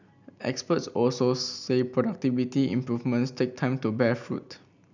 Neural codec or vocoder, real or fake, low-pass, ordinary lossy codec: none; real; 7.2 kHz; none